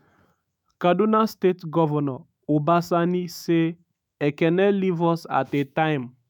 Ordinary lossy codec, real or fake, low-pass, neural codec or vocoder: none; fake; 19.8 kHz; autoencoder, 48 kHz, 128 numbers a frame, DAC-VAE, trained on Japanese speech